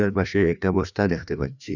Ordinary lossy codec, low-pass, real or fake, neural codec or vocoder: none; 7.2 kHz; fake; codec, 16 kHz, 2 kbps, FreqCodec, larger model